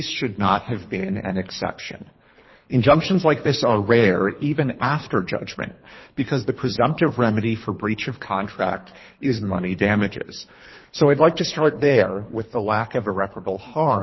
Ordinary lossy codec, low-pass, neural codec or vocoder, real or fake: MP3, 24 kbps; 7.2 kHz; codec, 24 kHz, 3 kbps, HILCodec; fake